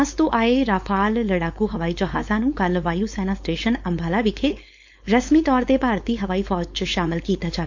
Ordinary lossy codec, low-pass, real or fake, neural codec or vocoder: MP3, 48 kbps; 7.2 kHz; fake; codec, 16 kHz, 4.8 kbps, FACodec